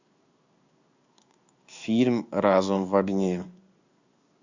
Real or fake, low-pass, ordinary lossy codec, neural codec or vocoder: fake; 7.2 kHz; Opus, 64 kbps; codec, 16 kHz in and 24 kHz out, 1 kbps, XY-Tokenizer